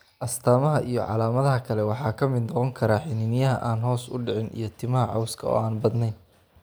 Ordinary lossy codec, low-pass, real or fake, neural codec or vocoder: none; none; real; none